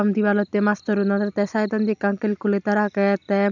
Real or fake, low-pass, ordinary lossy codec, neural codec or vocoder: real; 7.2 kHz; none; none